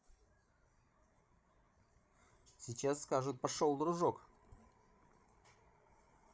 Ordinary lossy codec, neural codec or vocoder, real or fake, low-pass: none; codec, 16 kHz, 16 kbps, FreqCodec, larger model; fake; none